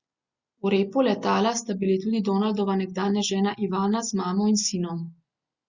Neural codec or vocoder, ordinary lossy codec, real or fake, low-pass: none; Opus, 64 kbps; real; 7.2 kHz